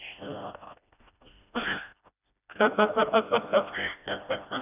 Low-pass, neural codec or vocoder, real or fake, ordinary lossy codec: 3.6 kHz; codec, 16 kHz, 1 kbps, FreqCodec, smaller model; fake; none